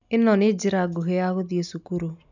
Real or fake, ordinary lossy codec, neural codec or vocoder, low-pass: real; none; none; 7.2 kHz